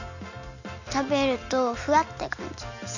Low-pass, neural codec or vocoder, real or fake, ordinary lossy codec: 7.2 kHz; none; real; none